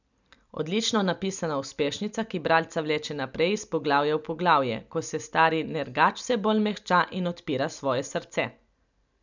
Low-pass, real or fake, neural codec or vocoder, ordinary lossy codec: 7.2 kHz; real; none; none